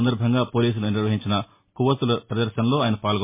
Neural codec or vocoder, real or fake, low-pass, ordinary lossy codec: none; real; 3.6 kHz; MP3, 24 kbps